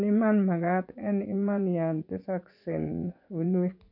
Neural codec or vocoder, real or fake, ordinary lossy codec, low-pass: vocoder, 24 kHz, 100 mel bands, Vocos; fake; none; 5.4 kHz